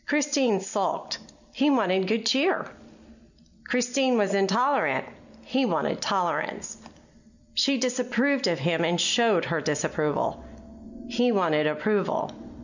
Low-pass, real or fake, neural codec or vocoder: 7.2 kHz; real; none